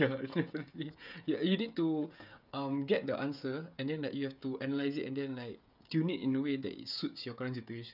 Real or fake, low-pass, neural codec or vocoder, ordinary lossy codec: fake; 5.4 kHz; codec, 16 kHz, 16 kbps, FreqCodec, smaller model; none